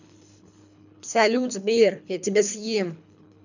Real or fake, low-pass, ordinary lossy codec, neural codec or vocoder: fake; 7.2 kHz; none; codec, 24 kHz, 3 kbps, HILCodec